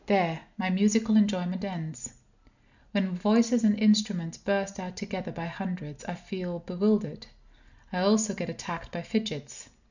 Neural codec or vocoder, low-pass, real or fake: none; 7.2 kHz; real